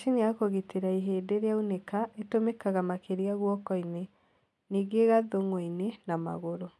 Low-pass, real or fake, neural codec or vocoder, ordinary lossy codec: none; real; none; none